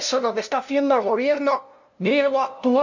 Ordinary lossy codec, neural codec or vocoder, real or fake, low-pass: none; codec, 16 kHz, 0.5 kbps, FunCodec, trained on LibriTTS, 25 frames a second; fake; 7.2 kHz